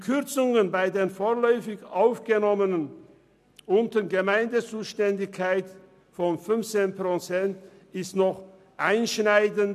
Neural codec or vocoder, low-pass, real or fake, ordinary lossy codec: none; 14.4 kHz; real; none